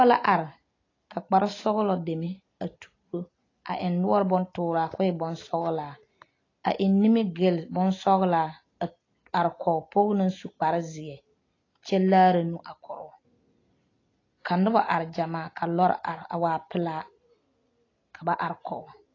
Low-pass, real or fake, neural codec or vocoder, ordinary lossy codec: 7.2 kHz; real; none; AAC, 32 kbps